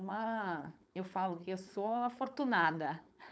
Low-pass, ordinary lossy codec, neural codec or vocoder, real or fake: none; none; codec, 16 kHz, 4.8 kbps, FACodec; fake